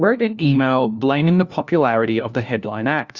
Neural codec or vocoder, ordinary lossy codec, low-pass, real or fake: codec, 16 kHz, 1 kbps, FunCodec, trained on LibriTTS, 50 frames a second; Opus, 64 kbps; 7.2 kHz; fake